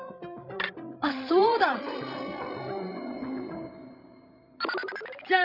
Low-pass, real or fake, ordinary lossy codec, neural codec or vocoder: 5.4 kHz; fake; none; codec, 16 kHz, 16 kbps, FreqCodec, larger model